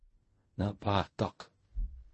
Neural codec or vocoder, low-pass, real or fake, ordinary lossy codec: codec, 16 kHz in and 24 kHz out, 0.4 kbps, LongCat-Audio-Codec, fine tuned four codebook decoder; 10.8 kHz; fake; MP3, 32 kbps